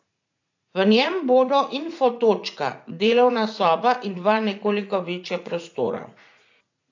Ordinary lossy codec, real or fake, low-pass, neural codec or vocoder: AAC, 48 kbps; fake; 7.2 kHz; vocoder, 44.1 kHz, 80 mel bands, Vocos